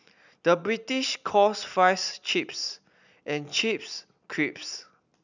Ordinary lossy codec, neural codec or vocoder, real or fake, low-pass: none; none; real; 7.2 kHz